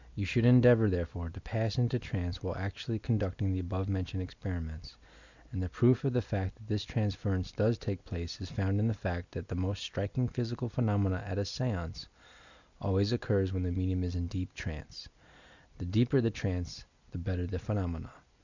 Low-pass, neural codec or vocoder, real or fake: 7.2 kHz; none; real